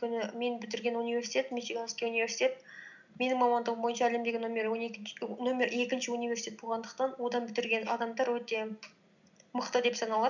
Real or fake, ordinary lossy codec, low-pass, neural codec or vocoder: real; none; 7.2 kHz; none